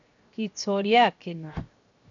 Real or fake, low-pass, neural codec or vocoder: fake; 7.2 kHz; codec, 16 kHz, 0.7 kbps, FocalCodec